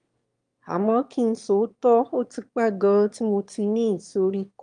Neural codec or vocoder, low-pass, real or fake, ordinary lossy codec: autoencoder, 22.05 kHz, a latent of 192 numbers a frame, VITS, trained on one speaker; 9.9 kHz; fake; Opus, 32 kbps